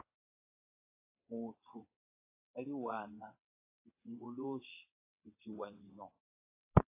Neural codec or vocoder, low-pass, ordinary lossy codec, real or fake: vocoder, 22.05 kHz, 80 mel bands, WaveNeXt; 3.6 kHz; AAC, 24 kbps; fake